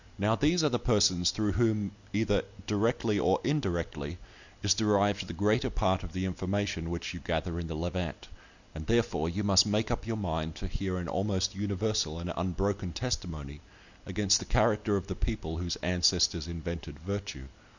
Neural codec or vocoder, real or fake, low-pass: none; real; 7.2 kHz